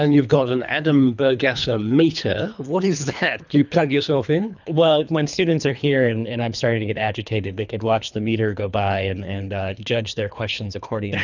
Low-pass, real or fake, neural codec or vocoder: 7.2 kHz; fake; codec, 24 kHz, 3 kbps, HILCodec